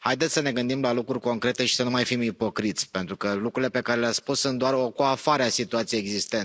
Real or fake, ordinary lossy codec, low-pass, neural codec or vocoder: real; none; none; none